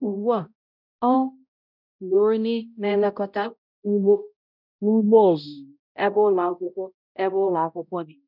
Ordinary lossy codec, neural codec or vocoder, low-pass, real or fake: none; codec, 16 kHz, 0.5 kbps, X-Codec, HuBERT features, trained on balanced general audio; 5.4 kHz; fake